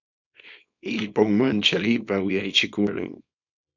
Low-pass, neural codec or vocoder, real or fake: 7.2 kHz; codec, 24 kHz, 0.9 kbps, WavTokenizer, small release; fake